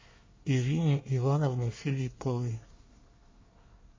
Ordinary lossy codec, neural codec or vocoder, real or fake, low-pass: MP3, 32 kbps; codec, 24 kHz, 1 kbps, SNAC; fake; 7.2 kHz